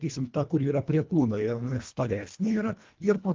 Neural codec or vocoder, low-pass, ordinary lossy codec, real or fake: codec, 24 kHz, 1.5 kbps, HILCodec; 7.2 kHz; Opus, 24 kbps; fake